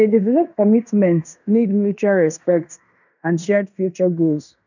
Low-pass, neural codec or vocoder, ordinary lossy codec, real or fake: 7.2 kHz; codec, 16 kHz in and 24 kHz out, 0.9 kbps, LongCat-Audio-Codec, fine tuned four codebook decoder; none; fake